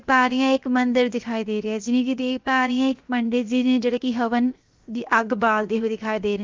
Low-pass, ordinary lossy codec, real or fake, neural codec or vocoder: 7.2 kHz; Opus, 32 kbps; fake; codec, 16 kHz, 0.7 kbps, FocalCodec